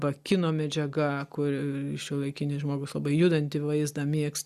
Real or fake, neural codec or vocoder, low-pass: real; none; 14.4 kHz